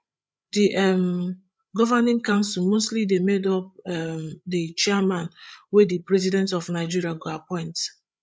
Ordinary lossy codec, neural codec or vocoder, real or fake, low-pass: none; codec, 16 kHz, 8 kbps, FreqCodec, larger model; fake; none